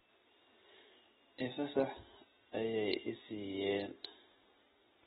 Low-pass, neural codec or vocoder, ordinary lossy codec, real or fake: 19.8 kHz; none; AAC, 16 kbps; real